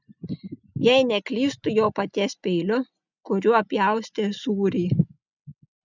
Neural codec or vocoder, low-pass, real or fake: none; 7.2 kHz; real